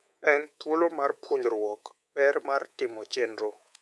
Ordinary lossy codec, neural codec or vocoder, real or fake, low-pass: none; codec, 24 kHz, 3.1 kbps, DualCodec; fake; none